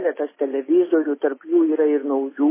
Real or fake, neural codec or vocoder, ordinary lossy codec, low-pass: real; none; MP3, 16 kbps; 3.6 kHz